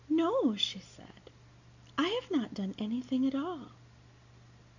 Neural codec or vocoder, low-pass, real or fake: none; 7.2 kHz; real